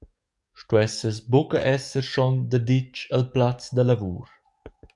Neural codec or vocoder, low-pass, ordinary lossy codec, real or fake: codec, 44.1 kHz, 7.8 kbps, DAC; 10.8 kHz; MP3, 96 kbps; fake